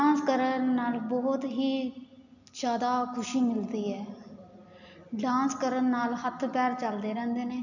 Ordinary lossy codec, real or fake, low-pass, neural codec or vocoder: none; real; 7.2 kHz; none